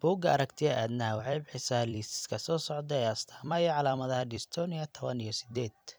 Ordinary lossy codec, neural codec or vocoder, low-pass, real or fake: none; vocoder, 44.1 kHz, 128 mel bands every 256 samples, BigVGAN v2; none; fake